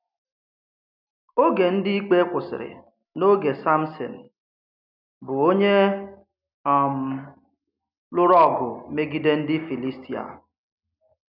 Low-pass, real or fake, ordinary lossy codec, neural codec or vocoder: 5.4 kHz; real; none; none